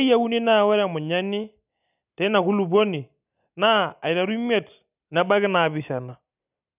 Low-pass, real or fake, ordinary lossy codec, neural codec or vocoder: 3.6 kHz; real; none; none